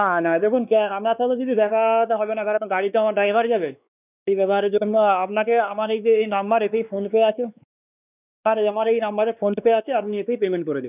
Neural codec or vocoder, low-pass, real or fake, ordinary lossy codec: codec, 16 kHz, 2 kbps, X-Codec, WavLM features, trained on Multilingual LibriSpeech; 3.6 kHz; fake; none